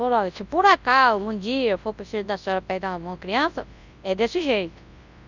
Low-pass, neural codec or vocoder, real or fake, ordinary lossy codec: 7.2 kHz; codec, 24 kHz, 0.9 kbps, WavTokenizer, large speech release; fake; Opus, 64 kbps